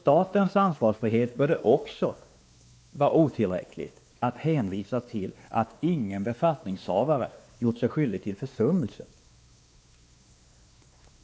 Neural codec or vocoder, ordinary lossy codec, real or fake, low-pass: codec, 16 kHz, 2 kbps, X-Codec, WavLM features, trained on Multilingual LibriSpeech; none; fake; none